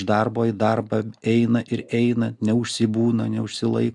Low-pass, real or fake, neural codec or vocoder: 10.8 kHz; real; none